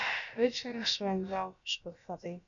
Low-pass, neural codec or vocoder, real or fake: 7.2 kHz; codec, 16 kHz, about 1 kbps, DyCAST, with the encoder's durations; fake